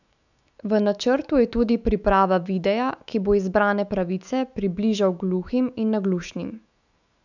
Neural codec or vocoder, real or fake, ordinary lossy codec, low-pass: autoencoder, 48 kHz, 128 numbers a frame, DAC-VAE, trained on Japanese speech; fake; none; 7.2 kHz